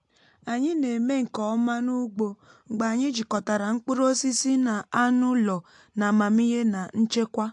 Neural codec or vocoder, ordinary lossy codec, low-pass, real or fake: none; AAC, 64 kbps; 10.8 kHz; real